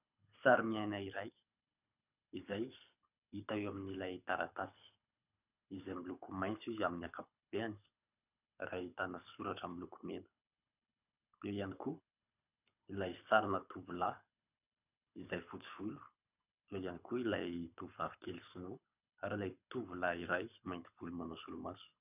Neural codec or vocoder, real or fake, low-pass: codec, 24 kHz, 6 kbps, HILCodec; fake; 3.6 kHz